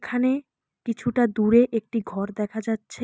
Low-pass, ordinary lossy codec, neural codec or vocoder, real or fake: none; none; none; real